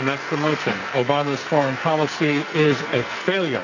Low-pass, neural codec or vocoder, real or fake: 7.2 kHz; codec, 44.1 kHz, 2.6 kbps, SNAC; fake